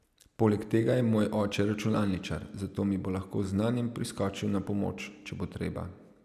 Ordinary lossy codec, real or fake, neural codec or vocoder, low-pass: none; real; none; 14.4 kHz